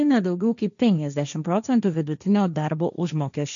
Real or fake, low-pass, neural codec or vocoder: fake; 7.2 kHz; codec, 16 kHz, 1.1 kbps, Voila-Tokenizer